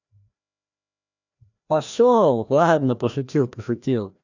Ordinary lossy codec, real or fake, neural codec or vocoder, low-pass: none; fake; codec, 16 kHz, 1 kbps, FreqCodec, larger model; 7.2 kHz